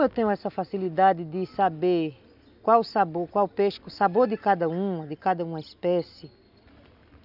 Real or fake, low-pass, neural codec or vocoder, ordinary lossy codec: real; 5.4 kHz; none; none